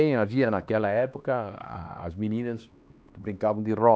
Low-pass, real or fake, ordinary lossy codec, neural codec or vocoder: none; fake; none; codec, 16 kHz, 2 kbps, X-Codec, HuBERT features, trained on LibriSpeech